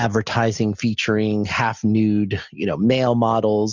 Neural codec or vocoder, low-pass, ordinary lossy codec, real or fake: none; 7.2 kHz; Opus, 64 kbps; real